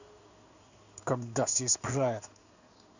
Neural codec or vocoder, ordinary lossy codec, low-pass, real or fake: codec, 16 kHz in and 24 kHz out, 1 kbps, XY-Tokenizer; none; 7.2 kHz; fake